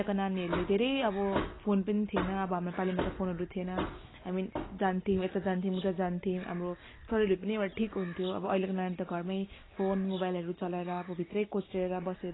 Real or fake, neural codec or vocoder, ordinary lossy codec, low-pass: real; none; AAC, 16 kbps; 7.2 kHz